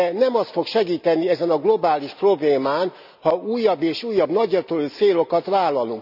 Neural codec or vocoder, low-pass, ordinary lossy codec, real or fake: none; 5.4 kHz; none; real